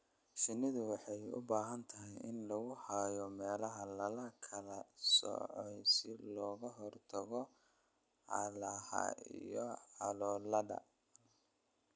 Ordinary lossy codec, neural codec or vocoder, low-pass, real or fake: none; none; none; real